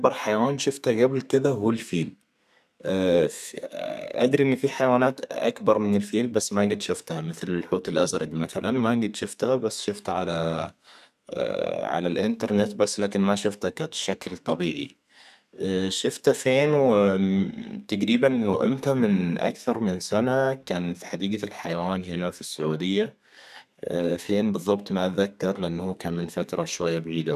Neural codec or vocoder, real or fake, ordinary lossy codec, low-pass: codec, 32 kHz, 1.9 kbps, SNAC; fake; none; 14.4 kHz